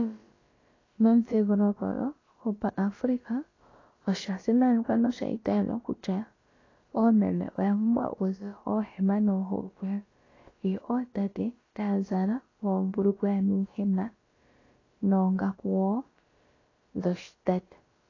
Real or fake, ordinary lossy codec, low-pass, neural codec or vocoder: fake; AAC, 32 kbps; 7.2 kHz; codec, 16 kHz, about 1 kbps, DyCAST, with the encoder's durations